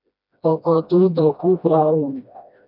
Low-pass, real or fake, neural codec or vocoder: 5.4 kHz; fake; codec, 16 kHz, 1 kbps, FreqCodec, smaller model